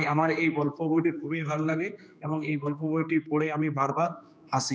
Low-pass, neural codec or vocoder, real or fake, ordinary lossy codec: none; codec, 16 kHz, 2 kbps, X-Codec, HuBERT features, trained on general audio; fake; none